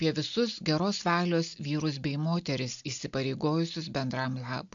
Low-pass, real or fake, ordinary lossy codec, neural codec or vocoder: 7.2 kHz; real; AAC, 64 kbps; none